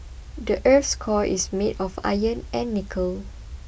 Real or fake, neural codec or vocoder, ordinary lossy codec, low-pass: real; none; none; none